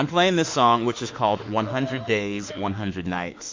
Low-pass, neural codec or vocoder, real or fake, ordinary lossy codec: 7.2 kHz; autoencoder, 48 kHz, 32 numbers a frame, DAC-VAE, trained on Japanese speech; fake; MP3, 48 kbps